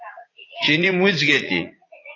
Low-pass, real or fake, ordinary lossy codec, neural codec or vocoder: 7.2 kHz; fake; AAC, 32 kbps; vocoder, 44.1 kHz, 80 mel bands, Vocos